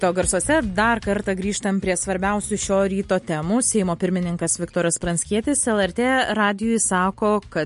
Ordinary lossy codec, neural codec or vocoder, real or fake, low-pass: MP3, 48 kbps; none; real; 10.8 kHz